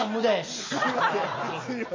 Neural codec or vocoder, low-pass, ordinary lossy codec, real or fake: none; 7.2 kHz; MP3, 32 kbps; real